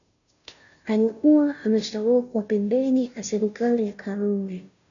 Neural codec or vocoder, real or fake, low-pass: codec, 16 kHz, 0.5 kbps, FunCodec, trained on Chinese and English, 25 frames a second; fake; 7.2 kHz